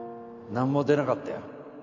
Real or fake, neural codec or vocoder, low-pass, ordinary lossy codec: real; none; 7.2 kHz; none